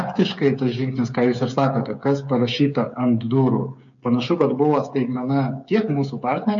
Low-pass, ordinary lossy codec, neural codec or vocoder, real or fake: 7.2 kHz; MP3, 48 kbps; codec, 16 kHz, 8 kbps, FreqCodec, smaller model; fake